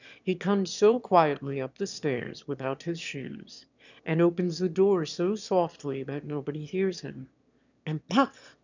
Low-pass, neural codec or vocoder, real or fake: 7.2 kHz; autoencoder, 22.05 kHz, a latent of 192 numbers a frame, VITS, trained on one speaker; fake